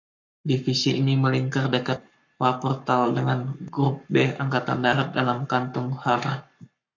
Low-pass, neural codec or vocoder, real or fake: 7.2 kHz; codec, 44.1 kHz, 7.8 kbps, Pupu-Codec; fake